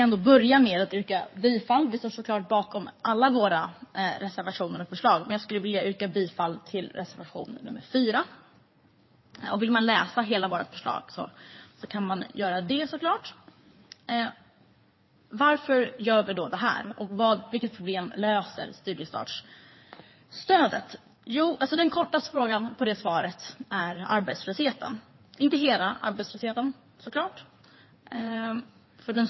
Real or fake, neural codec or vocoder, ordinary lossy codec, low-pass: fake; codec, 16 kHz in and 24 kHz out, 2.2 kbps, FireRedTTS-2 codec; MP3, 24 kbps; 7.2 kHz